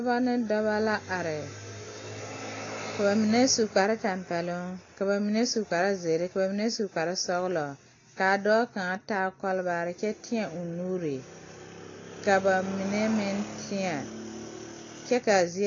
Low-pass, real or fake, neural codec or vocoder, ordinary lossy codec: 7.2 kHz; real; none; AAC, 32 kbps